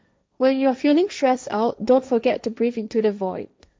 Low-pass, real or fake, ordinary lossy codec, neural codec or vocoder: none; fake; none; codec, 16 kHz, 1.1 kbps, Voila-Tokenizer